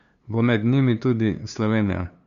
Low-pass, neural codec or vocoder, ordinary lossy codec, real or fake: 7.2 kHz; codec, 16 kHz, 2 kbps, FunCodec, trained on LibriTTS, 25 frames a second; none; fake